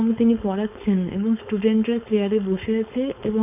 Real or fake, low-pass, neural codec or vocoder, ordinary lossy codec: fake; 3.6 kHz; codec, 16 kHz, 4.8 kbps, FACodec; none